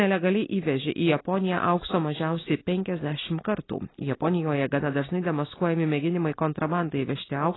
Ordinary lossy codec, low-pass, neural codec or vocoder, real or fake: AAC, 16 kbps; 7.2 kHz; none; real